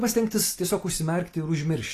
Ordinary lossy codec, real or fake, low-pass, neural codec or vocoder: AAC, 48 kbps; real; 14.4 kHz; none